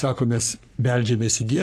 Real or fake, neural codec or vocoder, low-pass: fake; codec, 44.1 kHz, 3.4 kbps, Pupu-Codec; 14.4 kHz